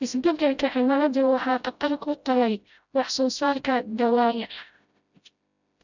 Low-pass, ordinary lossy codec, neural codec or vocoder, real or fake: 7.2 kHz; none; codec, 16 kHz, 0.5 kbps, FreqCodec, smaller model; fake